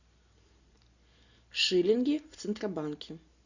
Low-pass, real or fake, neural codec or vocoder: 7.2 kHz; real; none